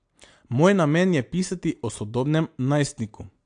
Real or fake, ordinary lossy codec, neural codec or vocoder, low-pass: real; AAC, 64 kbps; none; 9.9 kHz